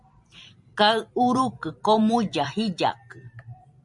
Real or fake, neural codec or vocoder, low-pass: fake; vocoder, 44.1 kHz, 128 mel bands every 512 samples, BigVGAN v2; 10.8 kHz